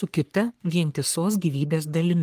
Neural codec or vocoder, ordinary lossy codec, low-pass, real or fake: codec, 44.1 kHz, 3.4 kbps, Pupu-Codec; Opus, 32 kbps; 14.4 kHz; fake